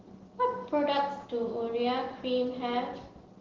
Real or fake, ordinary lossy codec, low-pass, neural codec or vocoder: real; Opus, 16 kbps; 7.2 kHz; none